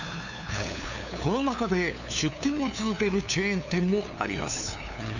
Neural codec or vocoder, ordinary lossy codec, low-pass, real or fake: codec, 16 kHz, 2 kbps, FunCodec, trained on LibriTTS, 25 frames a second; none; 7.2 kHz; fake